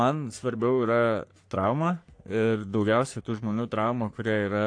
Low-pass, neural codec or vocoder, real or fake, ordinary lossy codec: 9.9 kHz; codec, 44.1 kHz, 3.4 kbps, Pupu-Codec; fake; AAC, 48 kbps